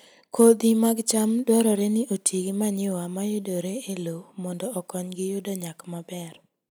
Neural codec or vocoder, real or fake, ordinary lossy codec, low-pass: none; real; none; none